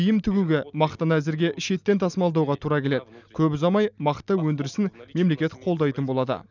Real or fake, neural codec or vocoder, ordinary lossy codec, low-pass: real; none; none; 7.2 kHz